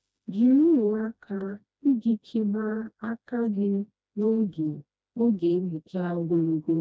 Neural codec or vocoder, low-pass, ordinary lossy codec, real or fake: codec, 16 kHz, 1 kbps, FreqCodec, smaller model; none; none; fake